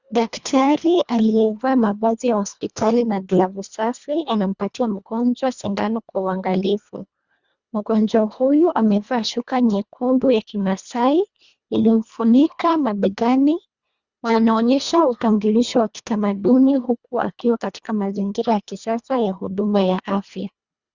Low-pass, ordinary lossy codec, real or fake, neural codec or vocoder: 7.2 kHz; Opus, 64 kbps; fake; codec, 24 kHz, 1.5 kbps, HILCodec